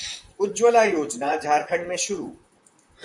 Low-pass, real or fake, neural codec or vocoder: 10.8 kHz; fake; vocoder, 44.1 kHz, 128 mel bands, Pupu-Vocoder